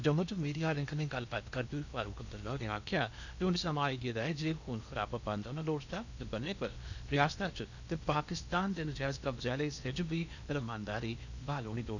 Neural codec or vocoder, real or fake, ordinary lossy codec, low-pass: codec, 16 kHz in and 24 kHz out, 0.8 kbps, FocalCodec, streaming, 65536 codes; fake; none; 7.2 kHz